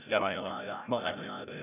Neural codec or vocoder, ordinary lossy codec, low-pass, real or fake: codec, 16 kHz, 0.5 kbps, FreqCodec, larger model; none; 3.6 kHz; fake